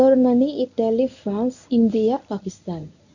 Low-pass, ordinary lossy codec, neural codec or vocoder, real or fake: 7.2 kHz; none; codec, 24 kHz, 0.9 kbps, WavTokenizer, medium speech release version 1; fake